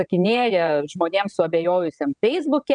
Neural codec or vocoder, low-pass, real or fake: vocoder, 22.05 kHz, 80 mel bands, WaveNeXt; 9.9 kHz; fake